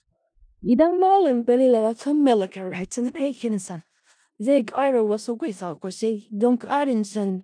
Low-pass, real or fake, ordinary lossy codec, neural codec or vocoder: 9.9 kHz; fake; none; codec, 16 kHz in and 24 kHz out, 0.4 kbps, LongCat-Audio-Codec, four codebook decoder